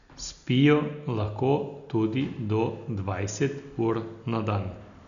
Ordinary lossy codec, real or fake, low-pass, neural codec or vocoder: none; real; 7.2 kHz; none